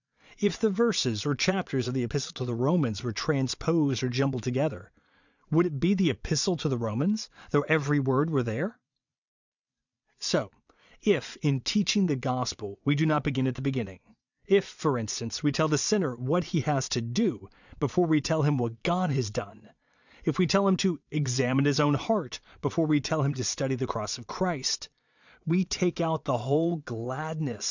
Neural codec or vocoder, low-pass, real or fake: vocoder, 22.05 kHz, 80 mel bands, Vocos; 7.2 kHz; fake